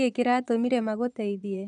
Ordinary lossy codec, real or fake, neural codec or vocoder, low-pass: none; fake; vocoder, 22.05 kHz, 80 mel bands, Vocos; 9.9 kHz